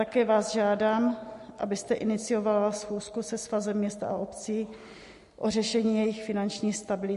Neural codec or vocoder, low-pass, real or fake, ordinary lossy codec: none; 14.4 kHz; real; MP3, 48 kbps